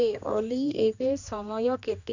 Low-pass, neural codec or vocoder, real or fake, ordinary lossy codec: 7.2 kHz; codec, 16 kHz, 2 kbps, X-Codec, HuBERT features, trained on general audio; fake; none